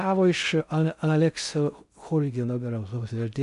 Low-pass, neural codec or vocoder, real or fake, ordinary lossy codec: 10.8 kHz; codec, 16 kHz in and 24 kHz out, 0.6 kbps, FocalCodec, streaming, 2048 codes; fake; AAC, 96 kbps